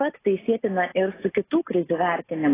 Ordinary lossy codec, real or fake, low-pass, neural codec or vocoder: AAC, 16 kbps; real; 3.6 kHz; none